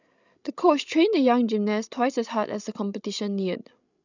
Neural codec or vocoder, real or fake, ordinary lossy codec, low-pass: codec, 16 kHz, 16 kbps, FreqCodec, larger model; fake; none; 7.2 kHz